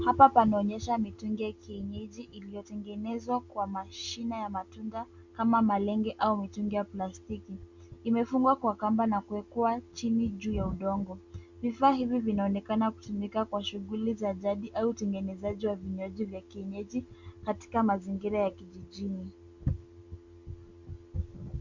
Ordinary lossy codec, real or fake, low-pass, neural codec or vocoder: Opus, 64 kbps; real; 7.2 kHz; none